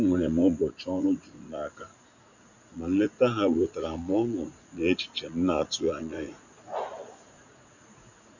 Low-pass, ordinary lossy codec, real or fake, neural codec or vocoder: 7.2 kHz; none; fake; vocoder, 44.1 kHz, 80 mel bands, Vocos